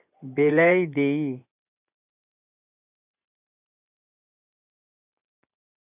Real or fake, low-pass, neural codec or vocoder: fake; 3.6 kHz; vocoder, 24 kHz, 100 mel bands, Vocos